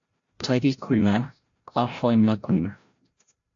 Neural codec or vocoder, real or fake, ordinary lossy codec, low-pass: codec, 16 kHz, 0.5 kbps, FreqCodec, larger model; fake; AAC, 48 kbps; 7.2 kHz